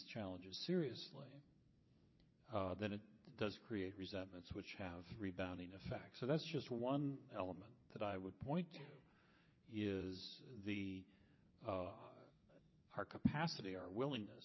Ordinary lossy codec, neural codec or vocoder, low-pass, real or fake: MP3, 24 kbps; vocoder, 22.05 kHz, 80 mel bands, WaveNeXt; 7.2 kHz; fake